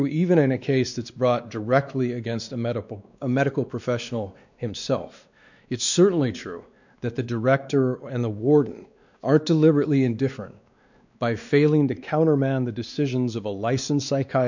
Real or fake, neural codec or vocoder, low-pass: fake; codec, 16 kHz, 2 kbps, X-Codec, WavLM features, trained on Multilingual LibriSpeech; 7.2 kHz